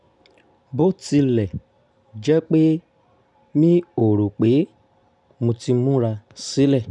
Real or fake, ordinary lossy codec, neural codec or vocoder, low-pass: real; none; none; 10.8 kHz